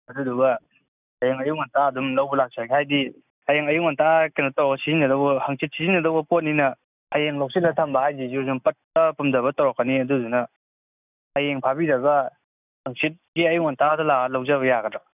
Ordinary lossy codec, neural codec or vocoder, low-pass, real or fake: none; none; 3.6 kHz; real